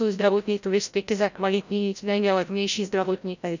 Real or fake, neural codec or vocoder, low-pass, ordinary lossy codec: fake; codec, 16 kHz, 0.5 kbps, FreqCodec, larger model; 7.2 kHz; none